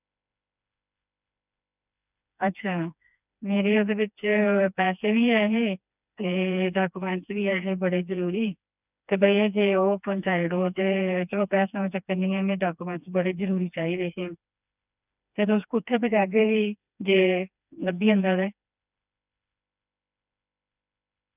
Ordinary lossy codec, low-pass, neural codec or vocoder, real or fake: none; 3.6 kHz; codec, 16 kHz, 2 kbps, FreqCodec, smaller model; fake